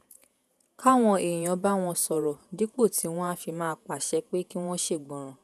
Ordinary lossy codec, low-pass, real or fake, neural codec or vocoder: none; 14.4 kHz; fake; vocoder, 48 kHz, 128 mel bands, Vocos